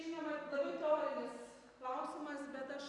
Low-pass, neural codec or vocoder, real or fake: 10.8 kHz; vocoder, 44.1 kHz, 128 mel bands every 256 samples, BigVGAN v2; fake